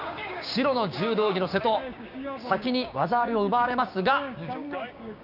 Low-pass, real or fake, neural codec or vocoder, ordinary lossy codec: 5.4 kHz; fake; codec, 16 kHz, 6 kbps, DAC; none